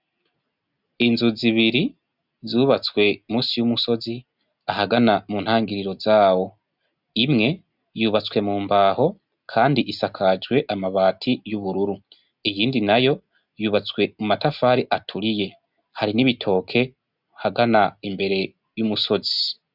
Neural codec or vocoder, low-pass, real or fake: none; 5.4 kHz; real